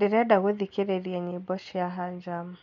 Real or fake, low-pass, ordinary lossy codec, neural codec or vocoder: real; 7.2 kHz; MP3, 48 kbps; none